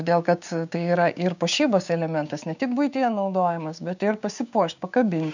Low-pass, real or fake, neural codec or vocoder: 7.2 kHz; fake; codec, 16 kHz, 6 kbps, DAC